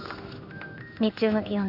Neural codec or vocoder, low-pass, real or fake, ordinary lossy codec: codec, 16 kHz in and 24 kHz out, 1 kbps, XY-Tokenizer; 5.4 kHz; fake; none